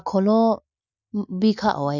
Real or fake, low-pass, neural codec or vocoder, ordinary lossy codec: real; 7.2 kHz; none; none